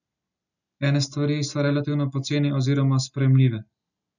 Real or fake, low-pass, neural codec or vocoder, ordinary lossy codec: real; 7.2 kHz; none; none